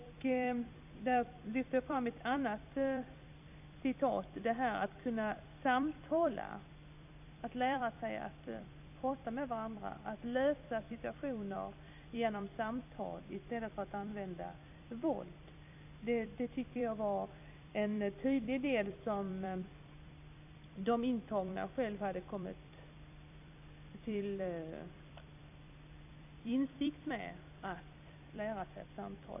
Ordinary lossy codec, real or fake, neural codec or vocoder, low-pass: AAC, 32 kbps; fake; vocoder, 44.1 kHz, 128 mel bands every 256 samples, BigVGAN v2; 3.6 kHz